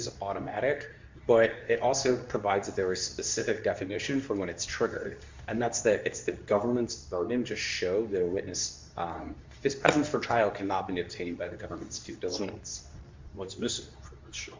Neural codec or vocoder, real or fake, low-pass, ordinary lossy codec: codec, 24 kHz, 0.9 kbps, WavTokenizer, medium speech release version 2; fake; 7.2 kHz; MP3, 64 kbps